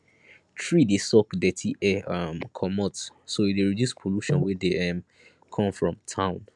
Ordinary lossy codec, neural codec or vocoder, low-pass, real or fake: MP3, 96 kbps; none; 10.8 kHz; real